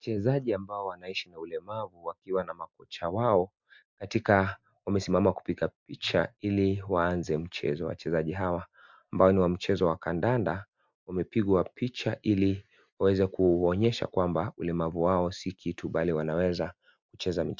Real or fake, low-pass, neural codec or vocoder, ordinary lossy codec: real; 7.2 kHz; none; MP3, 64 kbps